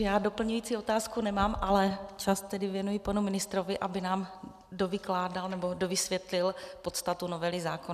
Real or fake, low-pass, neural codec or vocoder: real; 14.4 kHz; none